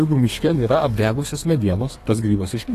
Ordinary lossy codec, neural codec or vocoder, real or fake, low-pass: AAC, 48 kbps; codec, 32 kHz, 1.9 kbps, SNAC; fake; 14.4 kHz